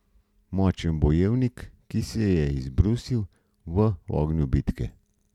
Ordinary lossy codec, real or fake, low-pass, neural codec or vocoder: none; real; 19.8 kHz; none